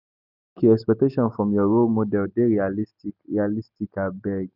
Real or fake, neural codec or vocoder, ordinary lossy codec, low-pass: real; none; none; 5.4 kHz